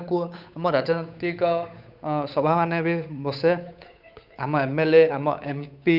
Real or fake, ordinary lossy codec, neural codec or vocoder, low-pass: fake; none; codec, 16 kHz, 8 kbps, FunCodec, trained on Chinese and English, 25 frames a second; 5.4 kHz